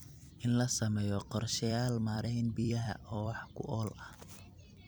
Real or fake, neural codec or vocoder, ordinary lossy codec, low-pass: fake; vocoder, 44.1 kHz, 128 mel bands every 256 samples, BigVGAN v2; none; none